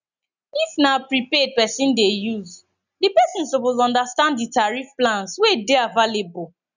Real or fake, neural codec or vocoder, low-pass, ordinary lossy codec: real; none; 7.2 kHz; none